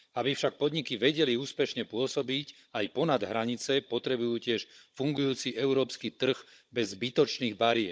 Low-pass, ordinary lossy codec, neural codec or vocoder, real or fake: none; none; codec, 16 kHz, 16 kbps, FunCodec, trained on Chinese and English, 50 frames a second; fake